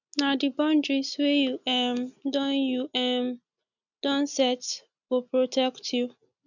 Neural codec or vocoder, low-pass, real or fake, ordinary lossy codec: none; 7.2 kHz; real; none